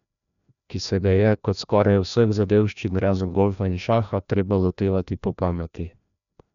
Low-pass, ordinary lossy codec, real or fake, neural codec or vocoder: 7.2 kHz; none; fake; codec, 16 kHz, 1 kbps, FreqCodec, larger model